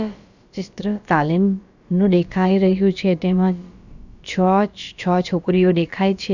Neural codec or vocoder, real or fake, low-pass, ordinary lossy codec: codec, 16 kHz, about 1 kbps, DyCAST, with the encoder's durations; fake; 7.2 kHz; none